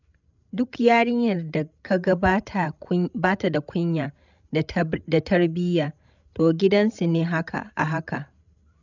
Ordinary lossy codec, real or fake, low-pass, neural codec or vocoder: none; fake; 7.2 kHz; codec, 16 kHz, 16 kbps, FreqCodec, larger model